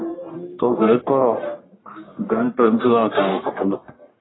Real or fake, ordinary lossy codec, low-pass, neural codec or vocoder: fake; AAC, 16 kbps; 7.2 kHz; codec, 44.1 kHz, 1.7 kbps, Pupu-Codec